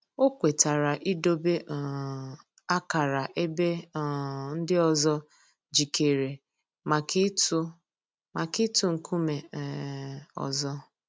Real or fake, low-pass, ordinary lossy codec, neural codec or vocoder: real; none; none; none